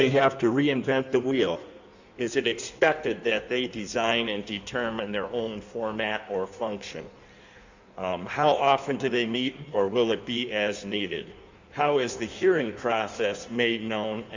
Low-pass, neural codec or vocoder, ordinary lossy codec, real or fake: 7.2 kHz; codec, 16 kHz in and 24 kHz out, 1.1 kbps, FireRedTTS-2 codec; Opus, 64 kbps; fake